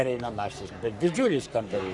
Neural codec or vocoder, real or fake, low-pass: codec, 44.1 kHz, 3.4 kbps, Pupu-Codec; fake; 10.8 kHz